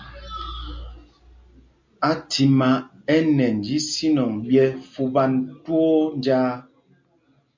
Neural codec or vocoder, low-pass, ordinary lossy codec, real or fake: none; 7.2 kHz; MP3, 48 kbps; real